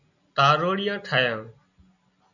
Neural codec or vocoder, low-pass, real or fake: none; 7.2 kHz; real